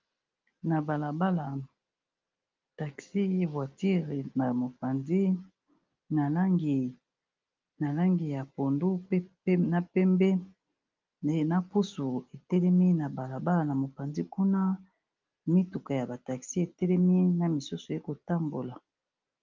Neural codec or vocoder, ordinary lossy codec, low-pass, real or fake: none; Opus, 24 kbps; 7.2 kHz; real